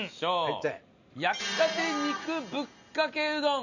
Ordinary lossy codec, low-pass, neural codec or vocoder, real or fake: none; 7.2 kHz; none; real